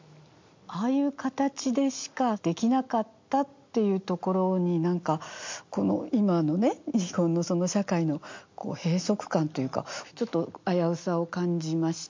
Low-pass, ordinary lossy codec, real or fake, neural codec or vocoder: 7.2 kHz; MP3, 48 kbps; real; none